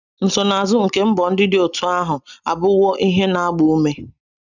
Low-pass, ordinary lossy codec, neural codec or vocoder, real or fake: 7.2 kHz; none; none; real